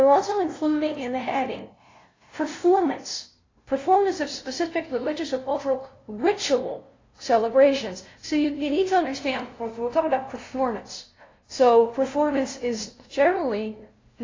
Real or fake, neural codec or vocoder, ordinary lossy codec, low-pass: fake; codec, 16 kHz, 0.5 kbps, FunCodec, trained on LibriTTS, 25 frames a second; AAC, 32 kbps; 7.2 kHz